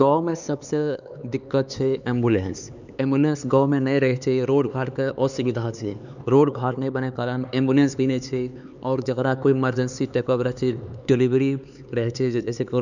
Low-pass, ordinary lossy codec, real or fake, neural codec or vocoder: 7.2 kHz; none; fake; codec, 16 kHz, 4 kbps, X-Codec, HuBERT features, trained on LibriSpeech